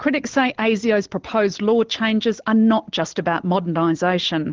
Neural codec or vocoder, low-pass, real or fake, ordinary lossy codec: none; 7.2 kHz; real; Opus, 16 kbps